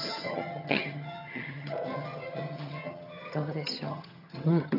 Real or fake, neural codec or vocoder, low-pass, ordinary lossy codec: fake; vocoder, 22.05 kHz, 80 mel bands, HiFi-GAN; 5.4 kHz; none